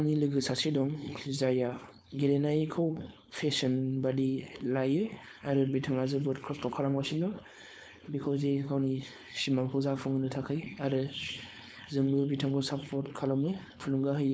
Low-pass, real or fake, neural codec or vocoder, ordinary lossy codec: none; fake; codec, 16 kHz, 4.8 kbps, FACodec; none